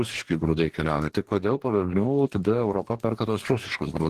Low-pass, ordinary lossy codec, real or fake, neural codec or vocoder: 14.4 kHz; Opus, 16 kbps; fake; codec, 44.1 kHz, 2.6 kbps, DAC